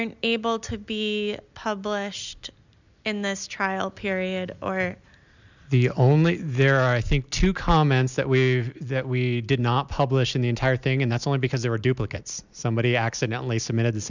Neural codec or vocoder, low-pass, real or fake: none; 7.2 kHz; real